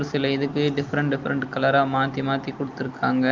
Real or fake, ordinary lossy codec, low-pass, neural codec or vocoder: real; Opus, 24 kbps; 7.2 kHz; none